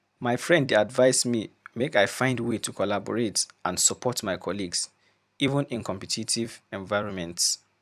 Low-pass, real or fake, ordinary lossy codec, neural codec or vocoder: 14.4 kHz; fake; none; vocoder, 44.1 kHz, 128 mel bands every 256 samples, BigVGAN v2